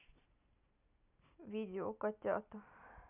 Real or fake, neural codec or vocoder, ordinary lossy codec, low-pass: real; none; none; 3.6 kHz